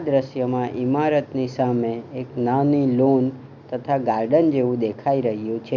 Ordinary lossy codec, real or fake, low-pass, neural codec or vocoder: none; real; 7.2 kHz; none